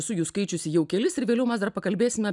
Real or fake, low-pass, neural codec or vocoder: real; 10.8 kHz; none